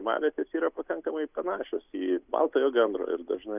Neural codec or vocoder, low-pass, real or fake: none; 3.6 kHz; real